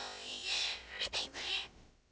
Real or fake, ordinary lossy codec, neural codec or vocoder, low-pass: fake; none; codec, 16 kHz, about 1 kbps, DyCAST, with the encoder's durations; none